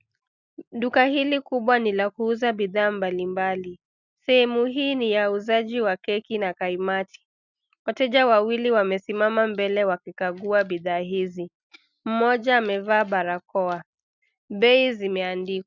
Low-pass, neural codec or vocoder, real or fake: 7.2 kHz; none; real